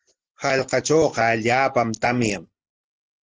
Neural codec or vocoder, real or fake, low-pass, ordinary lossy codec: none; real; 7.2 kHz; Opus, 16 kbps